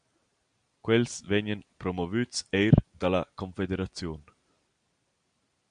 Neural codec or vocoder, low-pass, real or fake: none; 9.9 kHz; real